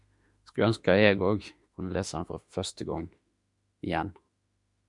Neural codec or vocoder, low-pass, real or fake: autoencoder, 48 kHz, 32 numbers a frame, DAC-VAE, trained on Japanese speech; 10.8 kHz; fake